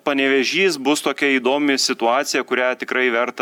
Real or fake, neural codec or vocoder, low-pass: real; none; 19.8 kHz